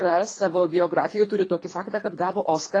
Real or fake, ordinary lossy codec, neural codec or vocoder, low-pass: fake; AAC, 32 kbps; codec, 24 kHz, 3 kbps, HILCodec; 9.9 kHz